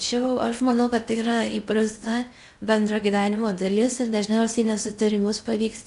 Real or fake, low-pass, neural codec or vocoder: fake; 10.8 kHz; codec, 16 kHz in and 24 kHz out, 0.6 kbps, FocalCodec, streaming, 4096 codes